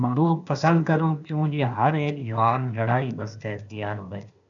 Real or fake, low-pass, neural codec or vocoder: fake; 7.2 kHz; codec, 16 kHz, 0.8 kbps, ZipCodec